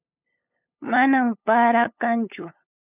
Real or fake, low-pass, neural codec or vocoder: fake; 3.6 kHz; codec, 16 kHz, 8 kbps, FunCodec, trained on LibriTTS, 25 frames a second